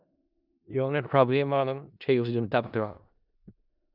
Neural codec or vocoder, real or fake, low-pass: codec, 16 kHz in and 24 kHz out, 0.4 kbps, LongCat-Audio-Codec, four codebook decoder; fake; 5.4 kHz